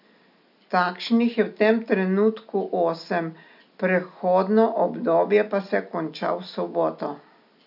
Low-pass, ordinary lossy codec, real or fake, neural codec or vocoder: 5.4 kHz; none; real; none